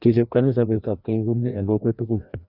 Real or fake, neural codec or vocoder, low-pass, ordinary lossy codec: fake; codec, 16 kHz, 1 kbps, FreqCodec, larger model; 5.4 kHz; none